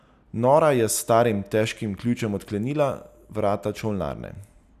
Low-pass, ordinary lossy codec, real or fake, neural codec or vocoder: 14.4 kHz; none; real; none